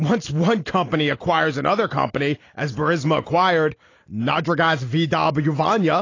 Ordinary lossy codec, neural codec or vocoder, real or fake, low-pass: AAC, 32 kbps; none; real; 7.2 kHz